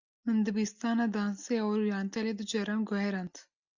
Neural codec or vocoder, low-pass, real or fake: none; 7.2 kHz; real